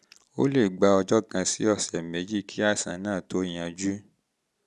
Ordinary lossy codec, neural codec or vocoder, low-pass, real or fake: none; none; none; real